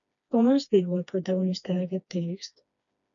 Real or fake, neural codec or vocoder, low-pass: fake; codec, 16 kHz, 2 kbps, FreqCodec, smaller model; 7.2 kHz